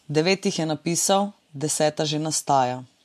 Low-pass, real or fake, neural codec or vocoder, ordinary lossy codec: 14.4 kHz; real; none; MP3, 64 kbps